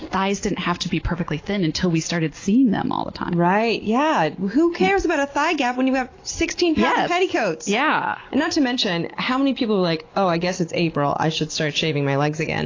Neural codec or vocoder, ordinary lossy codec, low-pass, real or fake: none; AAC, 32 kbps; 7.2 kHz; real